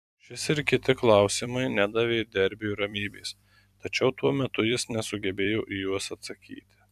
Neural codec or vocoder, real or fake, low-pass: vocoder, 44.1 kHz, 128 mel bands every 256 samples, BigVGAN v2; fake; 14.4 kHz